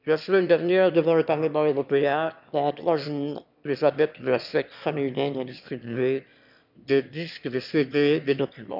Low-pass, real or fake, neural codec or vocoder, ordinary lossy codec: 5.4 kHz; fake; autoencoder, 22.05 kHz, a latent of 192 numbers a frame, VITS, trained on one speaker; none